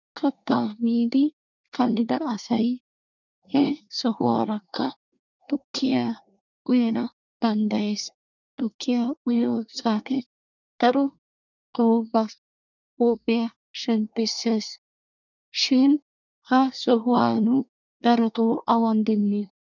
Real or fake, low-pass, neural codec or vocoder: fake; 7.2 kHz; codec, 24 kHz, 1 kbps, SNAC